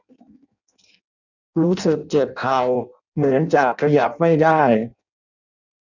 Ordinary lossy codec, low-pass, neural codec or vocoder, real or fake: none; 7.2 kHz; codec, 16 kHz in and 24 kHz out, 0.6 kbps, FireRedTTS-2 codec; fake